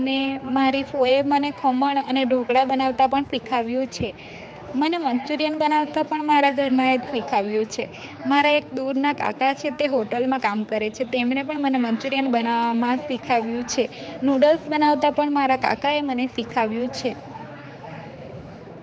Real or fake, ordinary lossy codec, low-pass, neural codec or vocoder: fake; none; none; codec, 16 kHz, 4 kbps, X-Codec, HuBERT features, trained on general audio